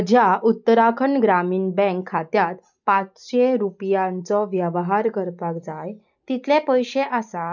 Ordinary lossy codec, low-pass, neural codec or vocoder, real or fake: none; 7.2 kHz; none; real